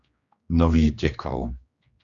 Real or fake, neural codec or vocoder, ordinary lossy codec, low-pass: fake; codec, 16 kHz, 2 kbps, X-Codec, HuBERT features, trained on general audio; Opus, 64 kbps; 7.2 kHz